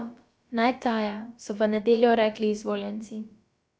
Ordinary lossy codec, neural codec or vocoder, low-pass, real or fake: none; codec, 16 kHz, about 1 kbps, DyCAST, with the encoder's durations; none; fake